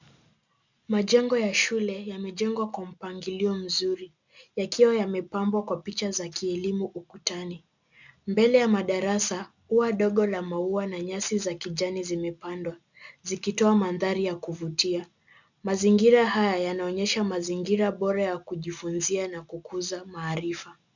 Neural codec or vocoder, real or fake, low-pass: none; real; 7.2 kHz